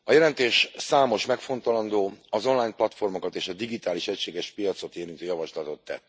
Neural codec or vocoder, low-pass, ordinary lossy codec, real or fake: none; none; none; real